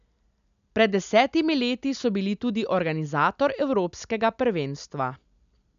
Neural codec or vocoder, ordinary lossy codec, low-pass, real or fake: none; none; 7.2 kHz; real